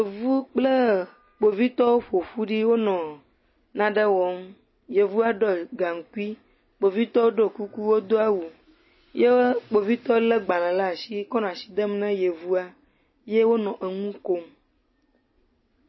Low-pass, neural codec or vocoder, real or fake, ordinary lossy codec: 7.2 kHz; none; real; MP3, 24 kbps